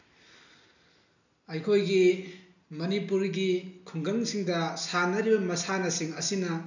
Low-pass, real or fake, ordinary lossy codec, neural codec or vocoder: 7.2 kHz; real; none; none